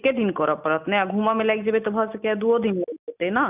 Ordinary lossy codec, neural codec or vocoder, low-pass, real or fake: none; none; 3.6 kHz; real